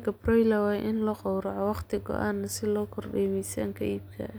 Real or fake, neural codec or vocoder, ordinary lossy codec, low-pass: real; none; none; none